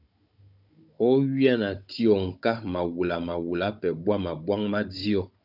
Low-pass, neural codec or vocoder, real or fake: 5.4 kHz; codec, 16 kHz, 16 kbps, FunCodec, trained on Chinese and English, 50 frames a second; fake